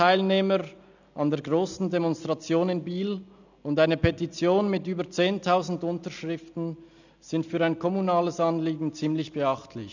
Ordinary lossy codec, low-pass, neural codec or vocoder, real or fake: none; 7.2 kHz; none; real